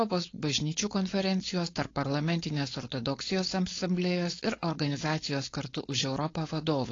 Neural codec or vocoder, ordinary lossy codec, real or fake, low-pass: codec, 16 kHz, 4.8 kbps, FACodec; AAC, 32 kbps; fake; 7.2 kHz